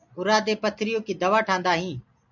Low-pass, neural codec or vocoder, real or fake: 7.2 kHz; none; real